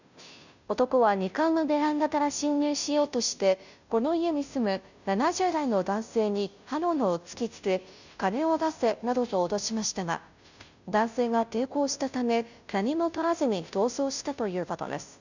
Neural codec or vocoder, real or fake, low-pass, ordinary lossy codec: codec, 16 kHz, 0.5 kbps, FunCodec, trained on Chinese and English, 25 frames a second; fake; 7.2 kHz; none